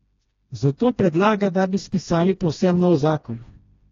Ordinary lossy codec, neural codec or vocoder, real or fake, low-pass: AAC, 32 kbps; codec, 16 kHz, 1 kbps, FreqCodec, smaller model; fake; 7.2 kHz